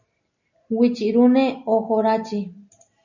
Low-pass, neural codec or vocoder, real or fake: 7.2 kHz; none; real